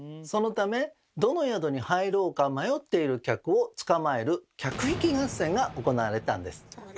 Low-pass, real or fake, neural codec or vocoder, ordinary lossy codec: none; real; none; none